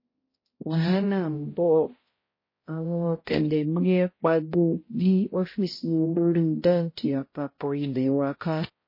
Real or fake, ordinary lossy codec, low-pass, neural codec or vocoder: fake; MP3, 24 kbps; 5.4 kHz; codec, 16 kHz, 0.5 kbps, X-Codec, HuBERT features, trained on balanced general audio